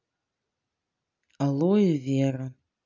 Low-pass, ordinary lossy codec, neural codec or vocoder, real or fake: 7.2 kHz; none; none; real